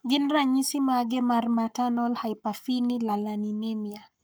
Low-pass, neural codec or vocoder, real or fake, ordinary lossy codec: none; codec, 44.1 kHz, 7.8 kbps, Pupu-Codec; fake; none